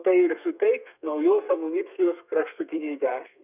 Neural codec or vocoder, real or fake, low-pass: codec, 32 kHz, 1.9 kbps, SNAC; fake; 3.6 kHz